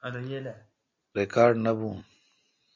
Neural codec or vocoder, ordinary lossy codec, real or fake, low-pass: none; MP3, 48 kbps; real; 7.2 kHz